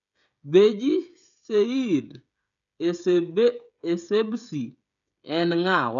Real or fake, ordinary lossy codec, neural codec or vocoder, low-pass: fake; none; codec, 16 kHz, 16 kbps, FreqCodec, smaller model; 7.2 kHz